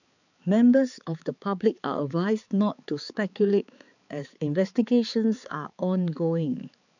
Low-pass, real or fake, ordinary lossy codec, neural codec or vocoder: 7.2 kHz; fake; none; codec, 16 kHz, 4 kbps, X-Codec, HuBERT features, trained on balanced general audio